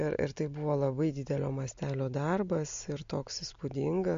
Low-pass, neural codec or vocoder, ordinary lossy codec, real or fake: 7.2 kHz; none; MP3, 48 kbps; real